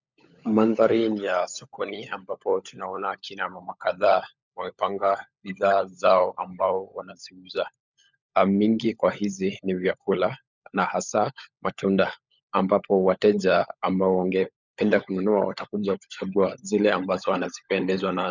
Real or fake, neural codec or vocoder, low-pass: fake; codec, 16 kHz, 16 kbps, FunCodec, trained on LibriTTS, 50 frames a second; 7.2 kHz